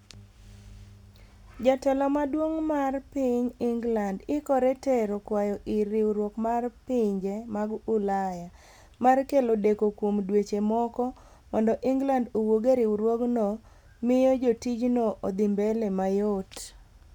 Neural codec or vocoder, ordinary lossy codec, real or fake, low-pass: none; none; real; 19.8 kHz